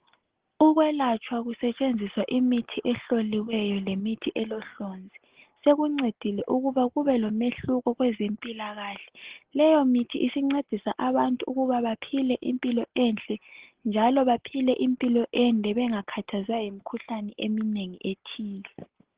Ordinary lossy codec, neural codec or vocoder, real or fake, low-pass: Opus, 16 kbps; none; real; 3.6 kHz